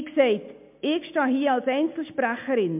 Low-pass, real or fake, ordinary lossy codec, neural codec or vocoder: 3.6 kHz; real; MP3, 32 kbps; none